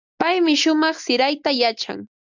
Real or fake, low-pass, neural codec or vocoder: real; 7.2 kHz; none